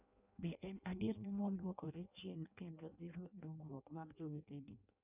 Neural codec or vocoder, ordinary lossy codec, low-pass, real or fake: codec, 16 kHz in and 24 kHz out, 0.6 kbps, FireRedTTS-2 codec; none; 3.6 kHz; fake